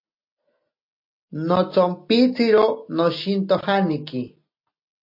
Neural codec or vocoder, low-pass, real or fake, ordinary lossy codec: none; 5.4 kHz; real; MP3, 32 kbps